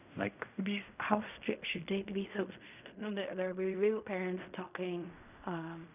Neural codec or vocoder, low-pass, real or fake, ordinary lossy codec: codec, 16 kHz in and 24 kHz out, 0.4 kbps, LongCat-Audio-Codec, fine tuned four codebook decoder; 3.6 kHz; fake; none